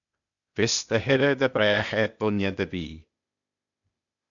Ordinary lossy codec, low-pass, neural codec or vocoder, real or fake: MP3, 96 kbps; 7.2 kHz; codec, 16 kHz, 0.8 kbps, ZipCodec; fake